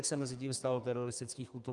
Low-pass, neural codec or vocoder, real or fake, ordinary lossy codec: 10.8 kHz; codec, 32 kHz, 1.9 kbps, SNAC; fake; Opus, 32 kbps